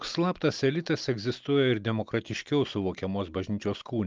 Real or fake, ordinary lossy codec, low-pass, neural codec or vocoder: real; Opus, 24 kbps; 7.2 kHz; none